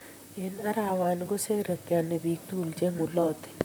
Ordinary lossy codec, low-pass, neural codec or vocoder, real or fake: none; none; vocoder, 44.1 kHz, 128 mel bands, Pupu-Vocoder; fake